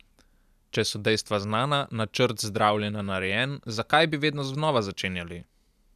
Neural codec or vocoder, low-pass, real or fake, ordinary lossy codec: none; 14.4 kHz; real; none